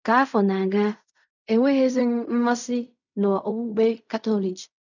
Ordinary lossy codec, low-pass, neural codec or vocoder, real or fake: none; 7.2 kHz; codec, 16 kHz in and 24 kHz out, 0.4 kbps, LongCat-Audio-Codec, fine tuned four codebook decoder; fake